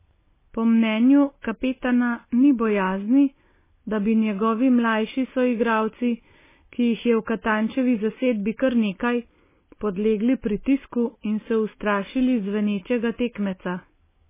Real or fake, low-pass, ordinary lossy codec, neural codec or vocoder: real; 3.6 kHz; MP3, 16 kbps; none